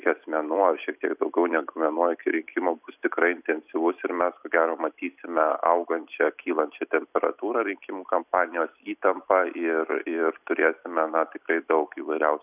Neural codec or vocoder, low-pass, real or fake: none; 3.6 kHz; real